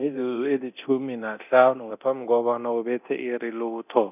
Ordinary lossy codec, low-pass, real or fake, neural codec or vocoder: none; 3.6 kHz; fake; codec, 24 kHz, 0.9 kbps, DualCodec